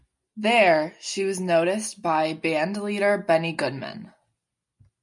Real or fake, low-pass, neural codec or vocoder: real; 10.8 kHz; none